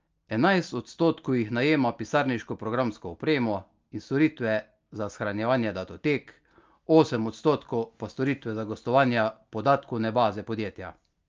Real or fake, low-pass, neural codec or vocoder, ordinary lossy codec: real; 7.2 kHz; none; Opus, 24 kbps